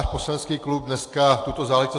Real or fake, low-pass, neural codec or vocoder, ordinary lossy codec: real; 10.8 kHz; none; AAC, 48 kbps